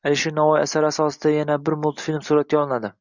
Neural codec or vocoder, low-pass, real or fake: none; 7.2 kHz; real